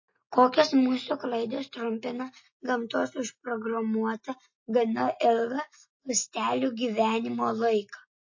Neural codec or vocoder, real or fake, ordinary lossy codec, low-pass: none; real; MP3, 32 kbps; 7.2 kHz